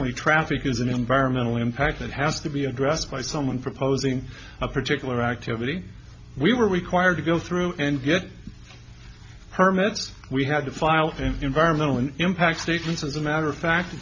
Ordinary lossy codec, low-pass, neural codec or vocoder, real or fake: MP3, 48 kbps; 7.2 kHz; none; real